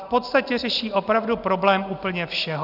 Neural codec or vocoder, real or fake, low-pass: none; real; 5.4 kHz